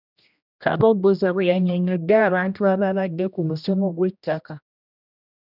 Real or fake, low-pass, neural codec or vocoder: fake; 5.4 kHz; codec, 16 kHz, 1 kbps, X-Codec, HuBERT features, trained on general audio